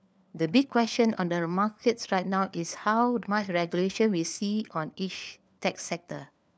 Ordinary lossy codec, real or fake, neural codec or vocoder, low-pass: none; fake; codec, 16 kHz, 4 kbps, FunCodec, trained on LibriTTS, 50 frames a second; none